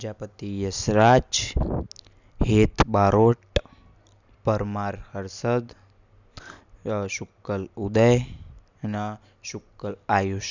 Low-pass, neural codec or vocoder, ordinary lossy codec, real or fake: 7.2 kHz; none; none; real